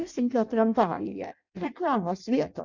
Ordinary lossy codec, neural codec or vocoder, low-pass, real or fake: Opus, 64 kbps; codec, 16 kHz in and 24 kHz out, 0.6 kbps, FireRedTTS-2 codec; 7.2 kHz; fake